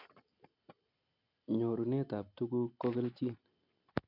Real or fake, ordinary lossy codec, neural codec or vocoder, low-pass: real; none; none; 5.4 kHz